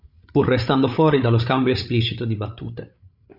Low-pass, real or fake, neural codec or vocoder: 5.4 kHz; fake; codec, 16 kHz, 8 kbps, FreqCodec, larger model